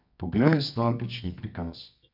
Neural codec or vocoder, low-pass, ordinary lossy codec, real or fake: codec, 24 kHz, 0.9 kbps, WavTokenizer, medium music audio release; 5.4 kHz; none; fake